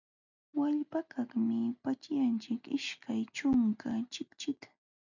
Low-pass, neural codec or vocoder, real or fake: 7.2 kHz; none; real